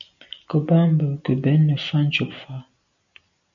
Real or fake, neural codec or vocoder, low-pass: real; none; 7.2 kHz